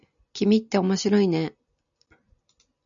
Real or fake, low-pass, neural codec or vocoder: real; 7.2 kHz; none